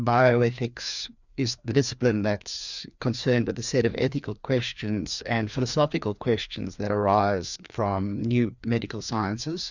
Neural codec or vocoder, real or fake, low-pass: codec, 16 kHz, 2 kbps, FreqCodec, larger model; fake; 7.2 kHz